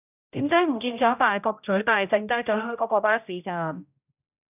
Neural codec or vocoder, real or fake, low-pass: codec, 16 kHz, 0.5 kbps, X-Codec, HuBERT features, trained on general audio; fake; 3.6 kHz